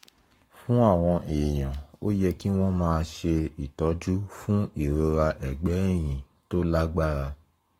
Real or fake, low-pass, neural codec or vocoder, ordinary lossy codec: fake; 19.8 kHz; codec, 44.1 kHz, 7.8 kbps, Pupu-Codec; AAC, 48 kbps